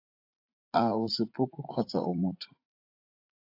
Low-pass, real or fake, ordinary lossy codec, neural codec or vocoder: 5.4 kHz; fake; AAC, 32 kbps; vocoder, 44.1 kHz, 80 mel bands, Vocos